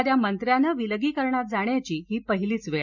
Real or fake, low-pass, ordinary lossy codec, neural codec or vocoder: real; 7.2 kHz; none; none